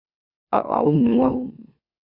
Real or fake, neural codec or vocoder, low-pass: fake; autoencoder, 44.1 kHz, a latent of 192 numbers a frame, MeloTTS; 5.4 kHz